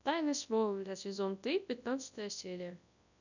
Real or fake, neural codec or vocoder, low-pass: fake; codec, 24 kHz, 0.9 kbps, WavTokenizer, large speech release; 7.2 kHz